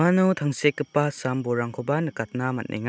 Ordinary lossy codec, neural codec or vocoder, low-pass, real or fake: none; none; none; real